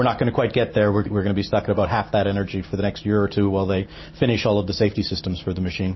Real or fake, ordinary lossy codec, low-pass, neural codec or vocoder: real; MP3, 24 kbps; 7.2 kHz; none